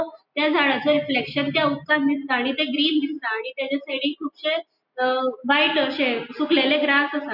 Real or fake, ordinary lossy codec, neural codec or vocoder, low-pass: real; none; none; 5.4 kHz